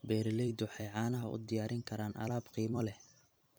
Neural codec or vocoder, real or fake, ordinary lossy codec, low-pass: vocoder, 44.1 kHz, 128 mel bands every 256 samples, BigVGAN v2; fake; none; none